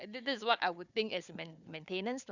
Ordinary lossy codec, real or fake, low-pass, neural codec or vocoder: none; fake; 7.2 kHz; codec, 16 kHz, 4 kbps, FunCodec, trained on Chinese and English, 50 frames a second